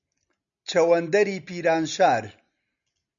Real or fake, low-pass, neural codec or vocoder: real; 7.2 kHz; none